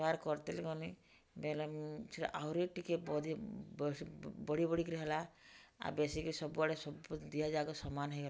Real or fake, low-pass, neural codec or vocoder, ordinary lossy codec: real; none; none; none